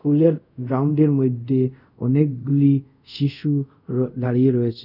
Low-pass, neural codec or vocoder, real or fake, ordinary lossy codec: 5.4 kHz; codec, 24 kHz, 0.5 kbps, DualCodec; fake; none